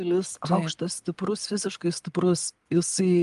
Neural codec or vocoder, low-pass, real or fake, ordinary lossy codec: none; 10.8 kHz; real; Opus, 32 kbps